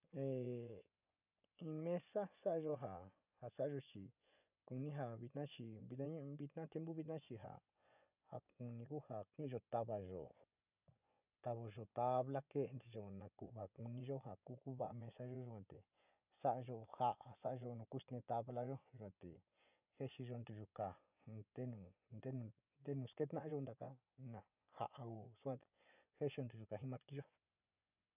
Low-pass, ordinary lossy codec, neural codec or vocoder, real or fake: 3.6 kHz; none; vocoder, 24 kHz, 100 mel bands, Vocos; fake